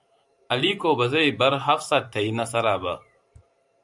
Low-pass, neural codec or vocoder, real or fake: 10.8 kHz; vocoder, 44.1 kHz, 128 mel bands every 512 samples, BigVGAN v2; fake